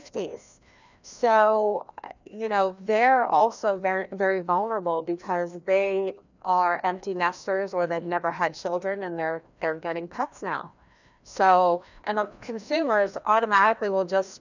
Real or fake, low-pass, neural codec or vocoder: fake; 7.2 kHz; codec, 16 kHz, 1 kbps, FreqCodec, larger model